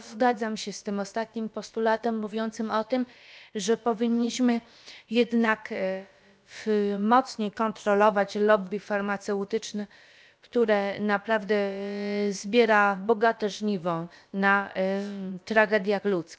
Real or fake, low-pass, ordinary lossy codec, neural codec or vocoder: fake; none; none; codec, 16 kHz, about 1 kbps, DyCAST, with the encoder's durations